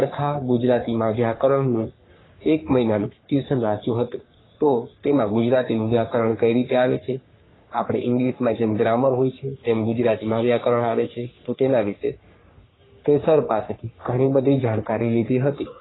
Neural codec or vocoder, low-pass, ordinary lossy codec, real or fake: codec, 44.1 kHz, 3.4 kbps, Pupu-Codec; 7.2 kHz; AAC, 16 kbps; fake